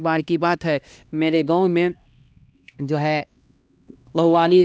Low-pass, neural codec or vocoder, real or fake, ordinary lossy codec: none; codec, 16 kHz, 1 kbps, X-Codec, HuBERT features, trained on LibriSpeech; fake; none